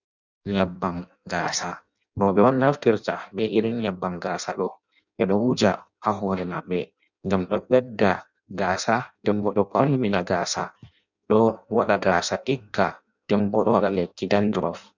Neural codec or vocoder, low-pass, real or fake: codec, 16 kHz in and 24 kHz out, 0.6 kbps, FireRedTTS-2 codec; 7.2 kHz; fake